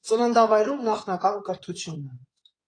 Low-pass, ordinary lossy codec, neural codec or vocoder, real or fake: 9.9 kHz; AAC, 32 kbps; codec, 16 kHz in and 24 kHz out, 2.2 kbps, FireRedTTS-2 codec; fake